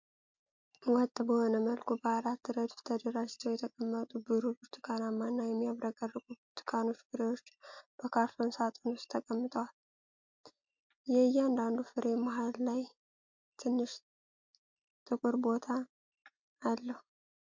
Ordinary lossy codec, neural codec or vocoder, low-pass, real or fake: MP3, 48 kbps; none; 7.2 kHz; real